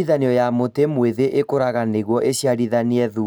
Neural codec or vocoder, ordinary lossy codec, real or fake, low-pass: none; none; real; none